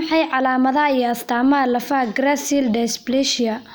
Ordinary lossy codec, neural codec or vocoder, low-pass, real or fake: none; none; none; real